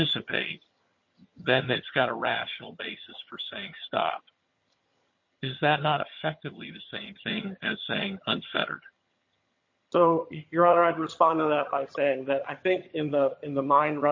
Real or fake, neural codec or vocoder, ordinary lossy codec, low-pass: fake; vocoder, 22.05 kHz, 80 mel bands, HiFi-GAN; MP3, 32 kbps; 7.2 kHz